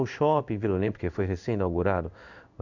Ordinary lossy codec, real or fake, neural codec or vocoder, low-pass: none; fake; codec, 16 kHz in and 24 kHz out, 1 kbps, XY-Tokenizer; 7.2 kHz